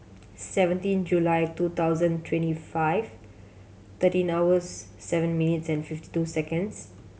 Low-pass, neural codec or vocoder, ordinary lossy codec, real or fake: none; none; none; real